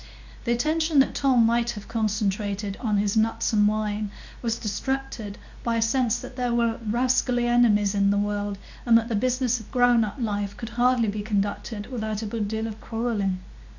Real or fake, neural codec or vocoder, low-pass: fake; codec, 24 kHz, 0.9 kbps, WavTokenizer, medium speech release version 2; 7.2 kHz